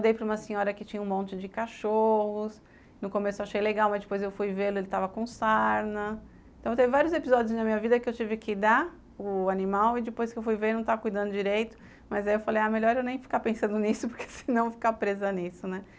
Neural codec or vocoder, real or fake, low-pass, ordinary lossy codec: none; real; none; none